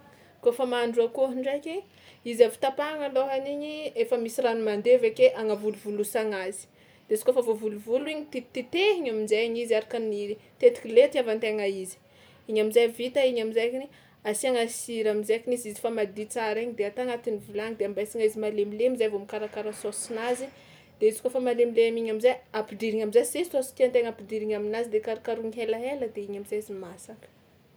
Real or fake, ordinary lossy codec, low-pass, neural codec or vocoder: real; none; none; none